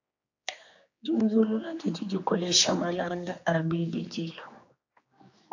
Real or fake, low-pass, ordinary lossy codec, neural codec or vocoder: fake; 7.2 kHz; AAC, 48 kbps; codec, 16 kHz, 2 kbps, X-Codec, HuBERT features, trained on general audio